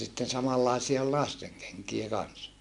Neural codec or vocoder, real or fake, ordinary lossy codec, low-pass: none; real; MP3, 64 kbps; 10.8 kHz